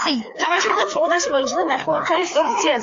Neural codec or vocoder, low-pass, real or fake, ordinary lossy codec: codec, 16 kHz, 2 kbps, FreqCodec, larger model; 7.2 kHz; fake; AAC, 48 kbps